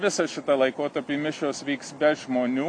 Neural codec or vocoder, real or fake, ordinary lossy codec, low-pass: none; real; MP3, 64 kbps; 9.9 kHz